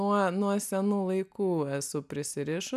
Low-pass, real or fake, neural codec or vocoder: 14.4 kHz; real; none